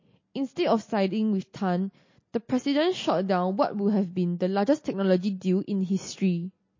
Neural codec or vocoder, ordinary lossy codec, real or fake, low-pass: none; MP3, 32 kbps; real; 7.2 kHz